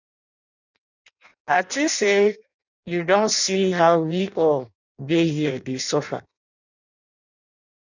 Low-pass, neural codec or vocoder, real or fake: 7.2 kHz; codec, 16 kHz in and 24 kHz out, 0.6 kbps, FireRedTTS-2 codec; fake